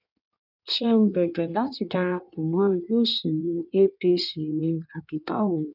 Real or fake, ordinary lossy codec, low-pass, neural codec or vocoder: fake; none; 5.4 kHz; codec, 16 kHz in and 24 kHz out, 1.1 kbps, FireRedTTS-2 codec